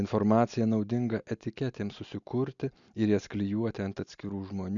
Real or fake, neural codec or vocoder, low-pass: real; none; 7.2 kHz